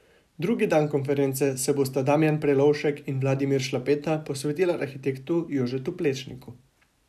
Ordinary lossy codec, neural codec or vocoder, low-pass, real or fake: none; none; 14.4 kHz; real